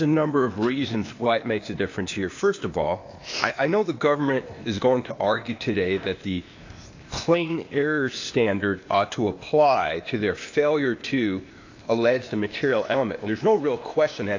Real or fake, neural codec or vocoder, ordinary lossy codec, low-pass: fake; codec, 16 kHz, 0.8 kbps, ZipCodec; AAC, 48 kbps; 7.2 kHz